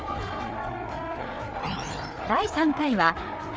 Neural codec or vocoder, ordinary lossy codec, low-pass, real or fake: codec, 16 kHz, 4 kbps, FreqCodec, larger model; none; none; fake